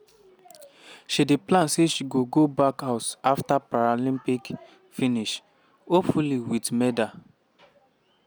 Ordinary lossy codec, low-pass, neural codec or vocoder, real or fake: none; none; none; real